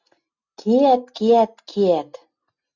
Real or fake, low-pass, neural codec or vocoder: real; 7.2 kHz; none